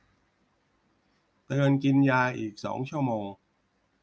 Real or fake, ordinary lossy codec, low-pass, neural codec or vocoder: real; none; none; none